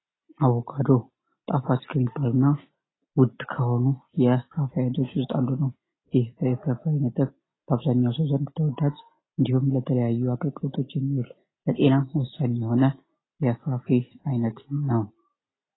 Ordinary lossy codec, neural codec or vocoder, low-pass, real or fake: AAC, 16 kbps; none; 7.2 kHz; real